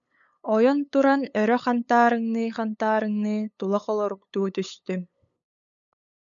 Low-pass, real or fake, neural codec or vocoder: 7.2 kHz; fake; codec, 16 kHz, 8 kbps, FunCodec, trained on LibriTTS, 25 frames a second